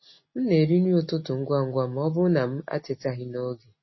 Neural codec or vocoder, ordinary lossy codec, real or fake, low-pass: none; MP3, 24 kbps; real; 7.2 kHz